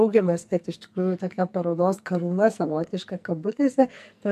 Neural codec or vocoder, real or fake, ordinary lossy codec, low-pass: codec, 44.1 kHz, 2.6 kbps, SNAC; fake; MP3, 64 kbps; 14.4 kHz